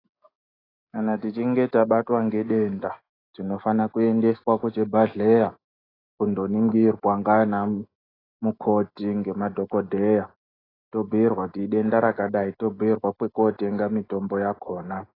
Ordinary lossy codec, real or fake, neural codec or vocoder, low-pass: AAC, 24 kbps; real; none; 5.4 kHz